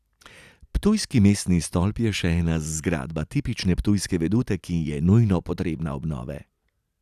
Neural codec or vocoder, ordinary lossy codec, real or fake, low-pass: none; none; real; 14.4 kHz